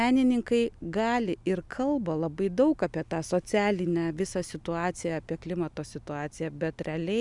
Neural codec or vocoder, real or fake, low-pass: none; real; 10.8 kHz